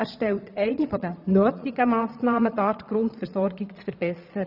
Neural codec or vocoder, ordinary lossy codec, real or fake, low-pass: vocoder, 22.05 kHz, 80 mel bands, Vocos; none; fake; 5.4 kHz